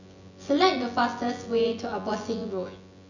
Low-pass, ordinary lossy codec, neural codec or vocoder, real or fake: 7.2 kHz; none; vocoder, 24 kHz, 100 mel bands, Vocos; fake